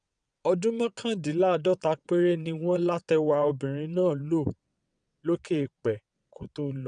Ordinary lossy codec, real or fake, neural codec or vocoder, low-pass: none; fake; vocoder, 22.05 kHz, 80 mel bands, WaveNeXt; 9.9 kHz